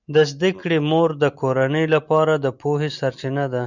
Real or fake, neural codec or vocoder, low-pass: real; none; 7.2 kHz